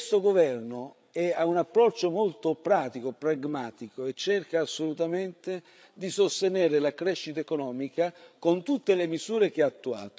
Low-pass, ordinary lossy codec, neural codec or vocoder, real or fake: none; none; codec, 16 kHz, 8 kbps, FreqCodec, larger model; fake